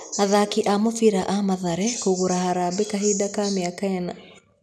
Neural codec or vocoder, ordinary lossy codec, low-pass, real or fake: none; none; none; real